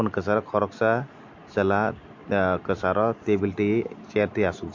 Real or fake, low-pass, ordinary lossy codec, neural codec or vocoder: real; 7.2 kHz; MP3, 48 kbps; none